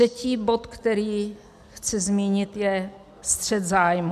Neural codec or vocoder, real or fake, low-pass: none; real; 14.4 kHz